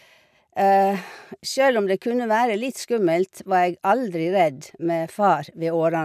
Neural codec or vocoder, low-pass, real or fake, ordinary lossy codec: none; 14.4 kHz; real; none